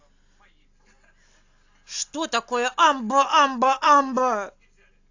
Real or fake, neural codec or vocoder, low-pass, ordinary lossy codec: real; none; 7.2 kHz; AAC, 48 kbps